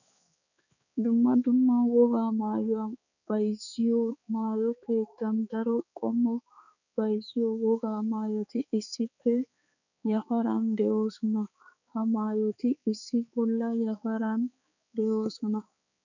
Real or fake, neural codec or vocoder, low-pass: fake; codec, 16 kHz, 4 kbps, X-Codec, HuBERT features, trained on balanced general audio; 7.2 kHz